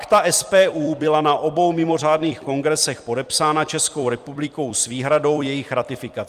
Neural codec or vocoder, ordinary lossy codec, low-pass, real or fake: vocoder, 44.1 kHz, 128 mel bands every 256 samples, BigVGAN v2; Opus, 32 kbps; 14.4 kHz; fake